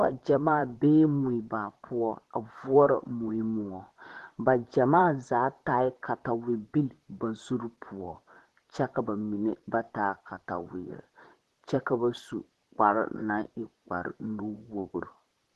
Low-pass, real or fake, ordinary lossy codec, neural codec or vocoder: 14.4 kHz; fake; Opus, 16 kbps; vocoder, 44.1 kHz, 128 mel bands, Pupu-Vocoder